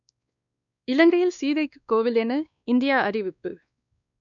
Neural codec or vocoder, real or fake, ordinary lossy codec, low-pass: codec, 16 kHz, 2 kbps, X-Codec, WavLM features, trained on Multilingual LibriSpeech; fake; none; 7.2 kHz